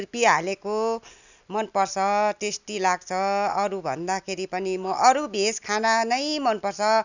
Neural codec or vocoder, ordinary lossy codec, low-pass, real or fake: none; none; 7.2 kHz; real